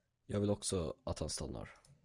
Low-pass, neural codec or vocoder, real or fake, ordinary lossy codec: 10.8 kHz; none; real; Opus, 64 kbps